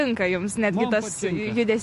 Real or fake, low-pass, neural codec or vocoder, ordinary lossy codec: real; 14.4 kHz; none; MP3, 48 kbps